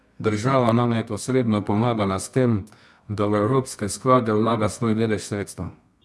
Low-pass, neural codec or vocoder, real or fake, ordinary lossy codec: none; codec, 24 kHz, 0.9 kbps, WavTokenizer, medium music audio release; fake; none